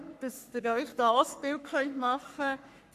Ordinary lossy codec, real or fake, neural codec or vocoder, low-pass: none; fake; codec, 44.1 kHz, 3.4 kbps, Pupu-Codec; 14.4 kHz